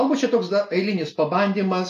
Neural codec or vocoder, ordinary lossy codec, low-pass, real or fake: none; AAC, 64 kbps; 14.4 kHz; real